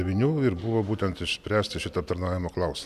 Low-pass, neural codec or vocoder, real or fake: 14.4 kHz; none; real